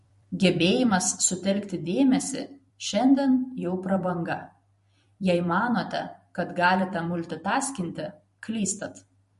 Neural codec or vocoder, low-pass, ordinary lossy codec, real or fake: vocoder, 44.1 kHz, 128 mel bands every 256 samples, BigVGAN v2; 14.4 kHz; MP3, 48 kbps; fake